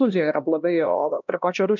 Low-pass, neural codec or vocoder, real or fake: 7.2 kHz; codec, 16 kHz, 1 kbps, X-Codec, HuBERT features, trained on LibriSpeech; fake